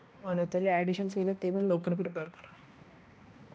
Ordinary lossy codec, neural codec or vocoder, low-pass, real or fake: none; codec, 16 kHz, 1 kbps, X-Codec, HuBERT features, trained on balanced general audio; none; fake